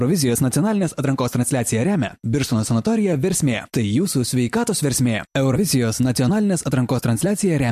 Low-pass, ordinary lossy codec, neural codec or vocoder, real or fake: 14.4 kHz; MP3, 64 kbps; none; real